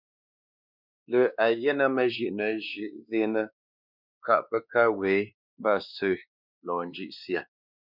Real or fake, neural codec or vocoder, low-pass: fake; codec, 16 kHz, 2 kbps, X-Codec, WavLM features, trained on Multilingual LibriSpeech; 5.4 kHz